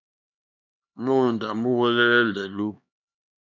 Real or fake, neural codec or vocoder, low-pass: fake; codec, 16 kHz, 2 kbps, X-Codec, HuBERT features, trained on LibriSpeech; 7.2 kHz